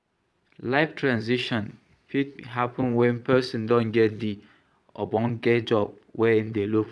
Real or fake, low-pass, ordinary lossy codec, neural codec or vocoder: fake; none; none; vocoder, 22.05 kHz, 80 mel bands, Vocos